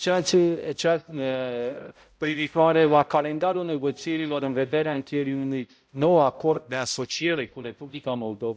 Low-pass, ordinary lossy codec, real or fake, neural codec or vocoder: none; none; fake; codec, 16 kHz, 0.5 kbps, X-Codec, HuBERT features, trained on balanced general audio